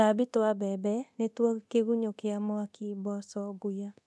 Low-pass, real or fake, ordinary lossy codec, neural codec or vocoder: none; fake; none; codec, 24 kHz, 0.9 kbps, DualCodec